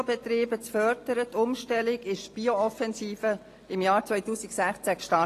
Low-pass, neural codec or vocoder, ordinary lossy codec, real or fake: 14.4 kHz; none; AAC, 48 kbps; real